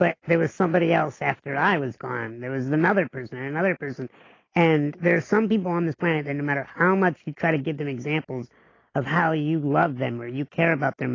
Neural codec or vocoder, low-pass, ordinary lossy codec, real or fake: none; 7.2 kHz; AAC, 32 kbps; real